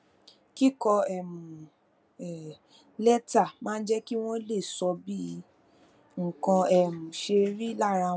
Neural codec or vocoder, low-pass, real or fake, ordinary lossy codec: none; none; real; none